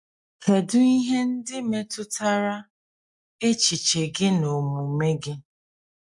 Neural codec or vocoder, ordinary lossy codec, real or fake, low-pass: none; MP3, 64 kbps; real; 10.8 kHz